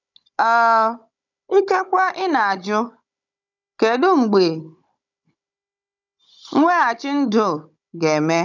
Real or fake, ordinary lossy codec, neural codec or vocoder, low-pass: fake; none; codec, 16 kHz, 16 kbps, FunCodec, trained on Chinese and English, 50 frames a second; 7.2 kHz